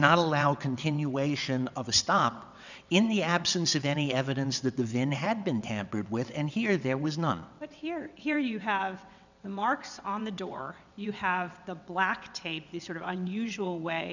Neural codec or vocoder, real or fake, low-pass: vocoder, 22.05 kHz, 80 mel bands, WaveNeXt; fake; 7.2 kHz